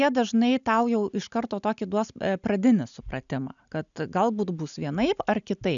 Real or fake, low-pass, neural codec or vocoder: real; 7.2 kHz; none